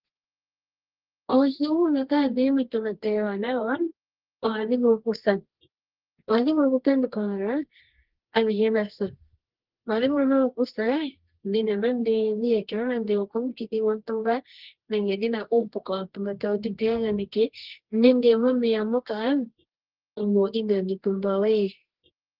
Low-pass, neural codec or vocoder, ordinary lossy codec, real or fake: 5.4 kHz; codec, 24 kHz, 0.9 kbps, WavTokenizer, medium music audio release; Opus, 16 kbps; fake